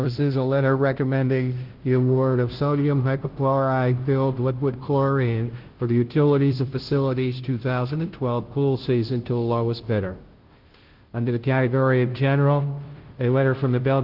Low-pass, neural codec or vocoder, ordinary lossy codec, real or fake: 5.4 kHz; codec, 16 kHz, 0.5 kbps, FunCodec, trained on Chinese and English, 25 frames a second; Opus, 16 kbps; fake